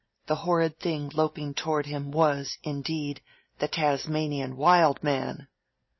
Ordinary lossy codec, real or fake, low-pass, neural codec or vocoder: MP3, 24 kbps; real; 7.2 kHz; none